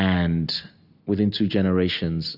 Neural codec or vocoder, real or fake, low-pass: none; real; 5.4 kHz